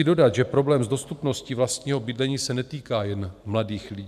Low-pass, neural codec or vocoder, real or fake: 14.4 kHz; vocoder, 44.1 kHz, 128 mel bands every 512 samples, BigVGAN v2; fake